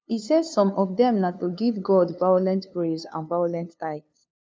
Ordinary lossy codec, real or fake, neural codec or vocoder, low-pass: none; fake; codec, 16 kHz, 2 kbps, FunCodec, trained on LibriTTS, 25 frames a second; none